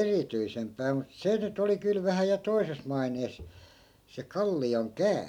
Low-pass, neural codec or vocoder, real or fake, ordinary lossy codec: 19.8 kHz; none; real; none